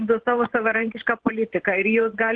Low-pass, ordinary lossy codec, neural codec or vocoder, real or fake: 9.9 kHz; Opus, 16 kbps; vocoder, 44.1 kHz, 128 mel bands every 512 samples, BigVGAN v2; fake